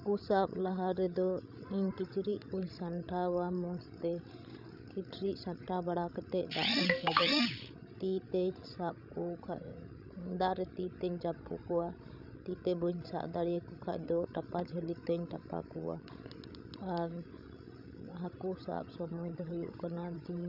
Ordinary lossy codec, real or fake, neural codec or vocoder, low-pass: none; fake; codec, 16 kHz, 16 kbps, FreqCodec, larger model; 5.4 kHz